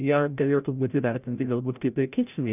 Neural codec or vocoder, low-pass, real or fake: codec, 16 kHz, 0.5 kbps, FreqCodec, larger model; 3.6 kHz; fake